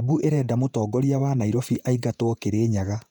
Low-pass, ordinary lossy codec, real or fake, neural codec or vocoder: 19.8 kHz; none; fake; vocoder, 48 kHz, 128 mel bands, Vocos